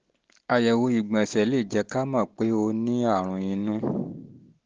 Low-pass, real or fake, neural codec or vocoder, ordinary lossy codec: 7.2 kHz; real; none; Opus, 16 kbps